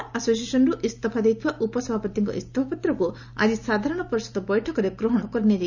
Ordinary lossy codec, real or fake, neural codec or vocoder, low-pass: none; real; none; 7.2 kHz